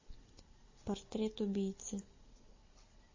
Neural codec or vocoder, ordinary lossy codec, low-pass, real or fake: none; MP3, 32 kbps; 7.2 kHz; real